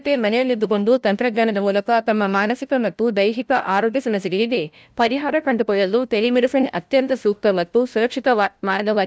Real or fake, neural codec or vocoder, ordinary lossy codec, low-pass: fake; codec, 16 kHz, 0.5 kbps, FunCodec, trained on LibriTTS, 25 frames a second; none; none